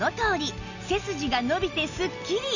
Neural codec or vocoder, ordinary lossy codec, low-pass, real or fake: none; none; 7.2 kHz; real